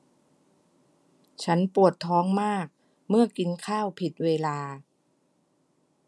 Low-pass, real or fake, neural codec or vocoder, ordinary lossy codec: none; real; none; none